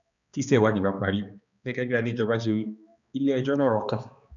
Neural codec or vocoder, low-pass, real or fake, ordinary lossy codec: codec, 16 kHz, 2 kbps, X-Codec, HuBERT features, trained on balanced general audio; 7.2 kHz; fake; none